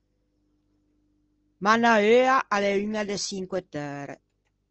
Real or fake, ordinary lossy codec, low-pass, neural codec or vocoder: real; Opus, 16 kbps; 7.2 kHz; none